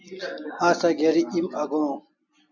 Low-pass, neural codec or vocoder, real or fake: 7.2 kHz; none; real